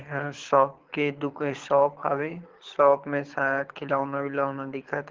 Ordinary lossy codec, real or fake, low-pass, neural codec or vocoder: Opus, 16 kbps; fake; 7.2 kHz; codec, 24 kHz, 6 kbps, HILCodec